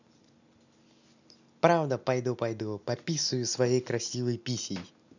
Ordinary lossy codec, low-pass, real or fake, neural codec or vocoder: none; 7.2 kHz; real; none